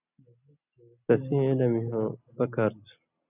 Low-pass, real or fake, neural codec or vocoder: 3.6 kHz; real; none